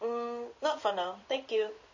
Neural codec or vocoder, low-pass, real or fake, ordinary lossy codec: codec, 16 kHz in and 24 kHz out, 1 kbps, XY-Tokenizer; 7.2 kHz; fake; MP3, 32 kbps